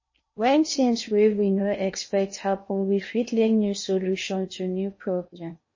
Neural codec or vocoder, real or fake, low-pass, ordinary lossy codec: codec, 16 kHz in and 24 kHz out, 0.8 kbps, FocalCodec, streaming, 65536 codes; fake; 7.2 kHz; MP3, 32 kbps